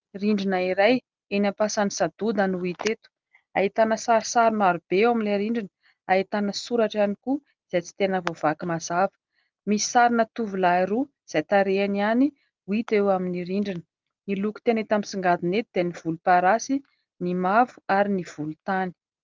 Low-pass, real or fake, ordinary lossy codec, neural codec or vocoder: 7.2 kHz; real; Opus, 32 kbps; none